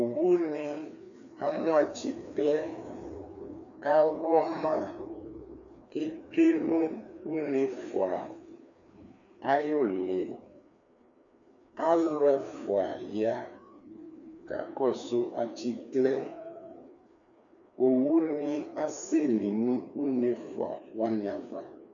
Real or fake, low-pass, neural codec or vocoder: fake; 7.2 kHz; codec, 16 kHz, 2 kbps, FreqCodec, larger model